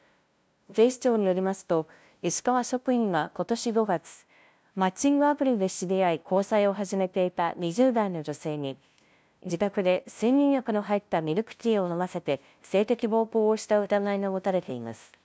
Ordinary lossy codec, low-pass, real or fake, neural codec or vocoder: none; none; fake; codec, 16 kHz, 0.5 kbps, FunCodec, trained on LibriTTS, 25 frames a second